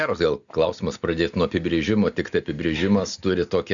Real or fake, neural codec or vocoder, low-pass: real; none; 7.2 kHz